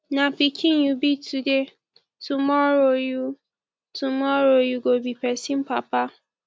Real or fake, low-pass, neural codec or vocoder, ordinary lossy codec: real; none; none; none